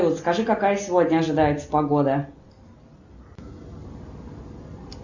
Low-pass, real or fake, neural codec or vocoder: 7.2 kHz; real; none